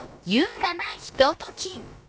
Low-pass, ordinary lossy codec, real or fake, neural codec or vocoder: none; none; fake; codec, 16 kHz, about 1 kbps, DyCAST, with the encoder's durations